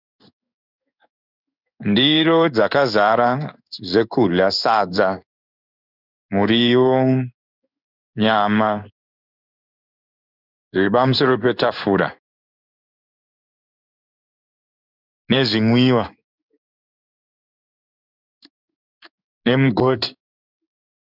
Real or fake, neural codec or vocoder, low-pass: fake; codec, 16 kHz in and 24 kHz out, 1 kbps, XY-Tokenizer; 5.4 kHz